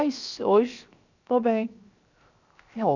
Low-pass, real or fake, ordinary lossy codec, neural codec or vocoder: 7.2 kHz; fake; none; codec, 16 kHz, 0.7 kbps, FocalCodec